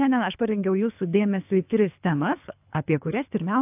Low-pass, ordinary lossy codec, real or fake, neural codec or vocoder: 3.6 kHz; AAC, 32 kbps; fake; codec, 24 kHz, 3 kbps, HILCodec